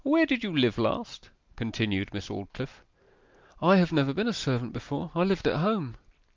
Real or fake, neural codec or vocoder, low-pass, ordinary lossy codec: real; none; 7.2 kHz; Opus, 24 kbps